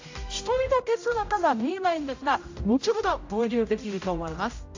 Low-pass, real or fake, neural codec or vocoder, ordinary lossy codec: 7.2 kHz; fake; codec, 16 kHz, 0.5 kbps, X-Codec, HuBERT features, trained on general audio; AAC, 48 kbps